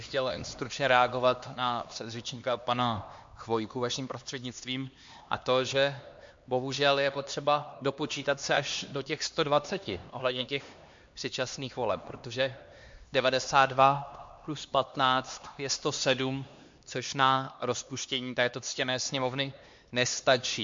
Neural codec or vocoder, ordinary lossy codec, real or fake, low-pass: codec, 16 kHz, 2 kbps, X-Codec, HuBERT features, trained on LibriSpeech; MP3, 48 kbps; fake; 7.2 kHz